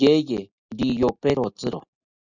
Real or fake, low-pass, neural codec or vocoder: real; 7.2 kHz; none